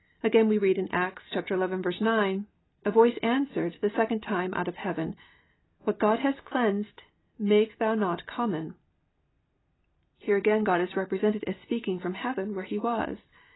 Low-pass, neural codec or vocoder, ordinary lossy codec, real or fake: 7.2 kHz; none; AAC, 16 kbps; real